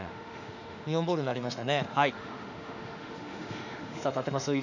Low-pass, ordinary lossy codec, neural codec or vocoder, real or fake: 7.2 kHz; none; autoencoder, 48 kHz, 32 numbers a frame, DAC-VAE, trained on Japanese speech; fake